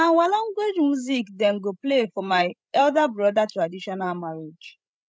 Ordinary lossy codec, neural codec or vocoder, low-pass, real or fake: none; codec, 16 kHz, 16 kbps, FreqCodec, larger model; none; fake